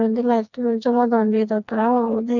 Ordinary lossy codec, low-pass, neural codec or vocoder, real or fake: none; 7.2 kHz; codec, 16 kHz, 2 kbps, FreqCodec, smaller model; fake